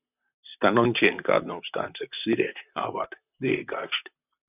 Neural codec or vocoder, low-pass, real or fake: vocoder, 44.1 kHz, 128 mel bands, Pupu-Vocoder; 3.6 kHz; fake